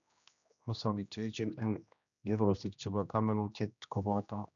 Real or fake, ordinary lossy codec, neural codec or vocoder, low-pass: fake; none; codec, 16 kHz, 1 kbps, X-Codec, HuBERT features, trained on general audio; 7.2 kHz